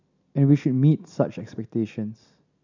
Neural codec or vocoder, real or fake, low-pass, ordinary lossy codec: none; real; 7.2 kHz; none